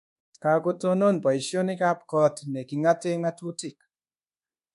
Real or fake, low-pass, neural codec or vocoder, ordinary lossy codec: fake; 10.8 kHz; codec, 24 kHz, 1.2 kbps, DualCodec; MP3, 64 kbps